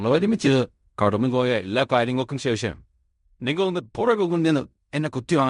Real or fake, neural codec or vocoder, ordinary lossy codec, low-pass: fake; codec, 16 kHz in and 24 kHz out, 0.4 kbps, LongCat-Audio-Codec, fine tuned four codebook decoder; MP3, 64 kbps; 10.8 kHz